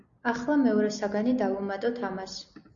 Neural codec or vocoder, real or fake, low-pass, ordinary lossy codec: none; real; 7.2 kHz; Opus, 64 kbps